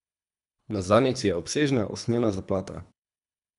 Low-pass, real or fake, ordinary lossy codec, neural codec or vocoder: 10.8 kHz; fake; none; codec, 24 kHz, 3 kbps, HILCodec